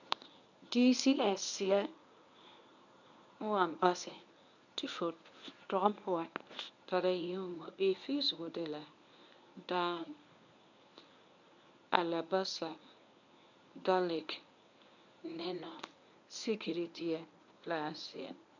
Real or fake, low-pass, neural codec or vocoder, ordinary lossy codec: fake; 7.2 kHz; codec, 24 kHz, 0.9 kbps, WavTokenizer, medium speech release version 1; none